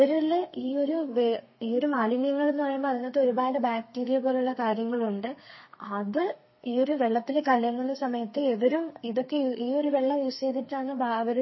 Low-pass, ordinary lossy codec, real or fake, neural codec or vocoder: 7.2 kHz; MP3, 24 kbps; fake; codec, 32 kHz, 1.9 kbps, SNAC